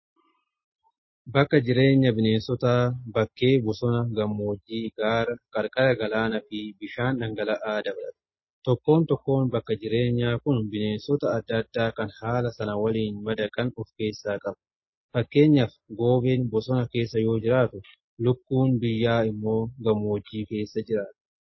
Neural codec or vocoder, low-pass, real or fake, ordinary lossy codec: none; 7.2 kHz; real; MP3, 24 kbps